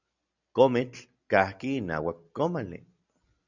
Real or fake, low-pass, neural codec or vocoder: real; 7.2 kHz; none